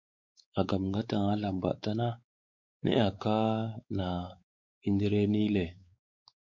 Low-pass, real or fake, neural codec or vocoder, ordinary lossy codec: 7.2 kHz; fake; codec, 16 kHz, 4 kbps, X-Codec, WavLM features, trained on Multilingual LibriSpeech; MP3, 48 kbps